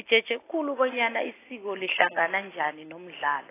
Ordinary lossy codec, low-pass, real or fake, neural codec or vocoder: AAC, 16 kbps; 3.6 kHz; real; none